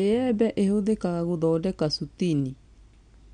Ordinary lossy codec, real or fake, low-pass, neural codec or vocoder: MP3, 64 kbps; real; 9.9 kHz; none